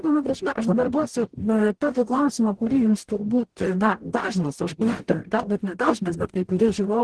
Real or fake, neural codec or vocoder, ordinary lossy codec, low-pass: fake; codec, 44.1 kHz, 0.9 kbps, DAC; Opus, 16 kbps; 10.8 kHz